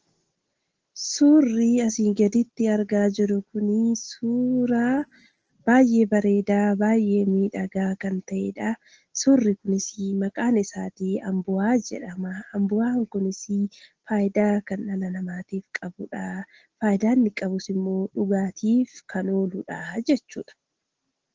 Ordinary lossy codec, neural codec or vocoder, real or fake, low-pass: Opus, 16 kbps; none; real; 7.2 kHz